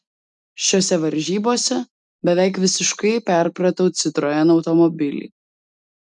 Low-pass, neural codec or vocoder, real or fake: 10.8 kHz; none; real